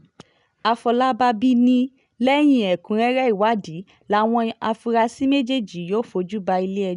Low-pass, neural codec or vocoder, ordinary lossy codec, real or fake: 10.8 kHz; none; none; real